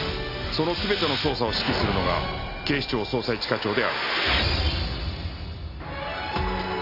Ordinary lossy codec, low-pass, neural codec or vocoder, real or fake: AAC, 32 kbps; 5.4 kHz; none; real